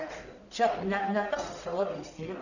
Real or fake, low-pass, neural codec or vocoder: fake; 7.2 kHz; codec, 44.1 kHz, 1.7 kbps, Pupu-Codec